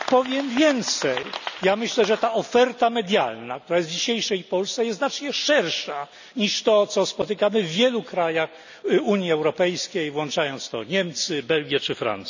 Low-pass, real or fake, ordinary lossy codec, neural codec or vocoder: 7.2 kHz; real; none; none